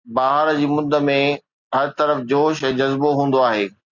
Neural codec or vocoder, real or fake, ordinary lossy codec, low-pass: none; real; Opus, 64 kbps; 7.2 kHz